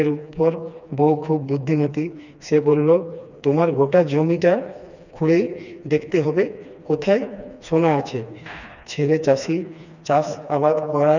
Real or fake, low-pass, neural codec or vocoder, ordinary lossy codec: fake; 7.2 kHz; codec, 16 kHz, 2 kbps, FreqCodec, smaller model; none